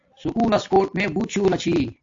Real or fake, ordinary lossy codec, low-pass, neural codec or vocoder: real; AAC, 48 kbps; 7.2 kHz; none